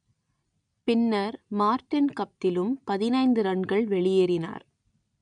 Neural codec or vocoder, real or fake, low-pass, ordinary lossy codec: none; real; 9.9 kHz; none